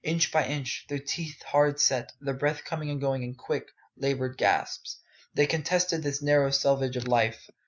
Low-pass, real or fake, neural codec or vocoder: 7.2 kHz; real; none